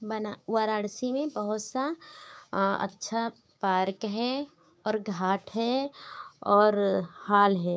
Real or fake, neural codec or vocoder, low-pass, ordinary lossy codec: fake; codec, 16 kHz, 6 kbps, DAC; none; none